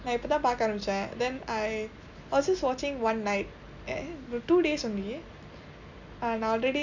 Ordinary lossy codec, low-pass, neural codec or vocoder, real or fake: none; 7.2 kHz; none; real